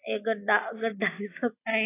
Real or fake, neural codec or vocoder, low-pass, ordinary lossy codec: real; none; 3.6 kHz; AAC, 16 kbps